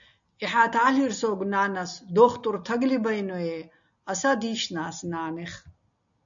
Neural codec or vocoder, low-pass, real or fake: none; 7.2 kHz; real